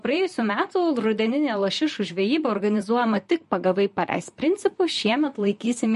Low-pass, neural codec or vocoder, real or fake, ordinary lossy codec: 14.4 kHz; vocoder, 44.1 kHz, 128 mel bands, Pupu-Vocoder; fake; MP3, 48 kbps